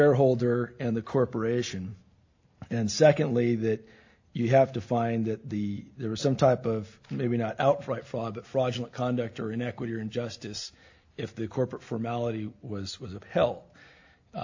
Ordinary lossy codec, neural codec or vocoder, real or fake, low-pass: AAC, 48 kbps; none; real; 7.2 kHz